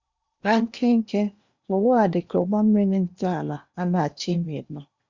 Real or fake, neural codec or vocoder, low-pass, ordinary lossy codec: fake; codec, 16 kHz in and 24 kHz out, 0.8 kbps, FocalCodec, streaming, 65536 codes; 7.2 kHz; Opus, 64 kbps